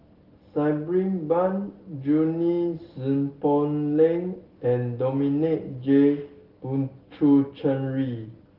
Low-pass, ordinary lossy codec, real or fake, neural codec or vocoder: 5.4 kHz; Opus, 16 kbps; real; none